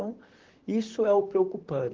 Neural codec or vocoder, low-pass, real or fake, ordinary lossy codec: vocoder, 44.1 kHz, 128 mel bands, Pupu-Vocoder; 7.2 kHz; fake; Opus, 16 kbps